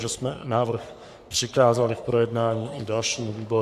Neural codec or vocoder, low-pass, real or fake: codec, 44.1 kHz, 3.4 kbps, Pupu-Codec; 14.4 kHz; fake